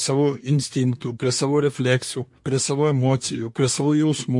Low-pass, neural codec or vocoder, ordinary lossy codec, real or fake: 10.8 kHz; codec, 24 kHz, 1 kbps, SNAC; MP3, 48 kbps; fake